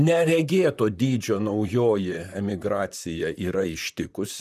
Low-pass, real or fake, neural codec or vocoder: 14.4 kHz; fake; vocoder, 44.1 kHz, 128 mel bands, Pupu-Vocoder